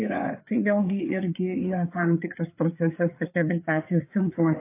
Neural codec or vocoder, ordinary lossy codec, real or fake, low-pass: codec, 16 kHz, 4 kbps, FreqCodec, larger model; AAC, 24 kbps; fake; 3.6 kHz